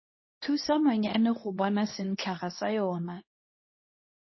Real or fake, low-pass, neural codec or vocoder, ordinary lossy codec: fake; 7.2 kHz; codec, 24 kHz, 0.9 kbps, WavTokenizer, medium speech release version 2; MP3, 24 kbps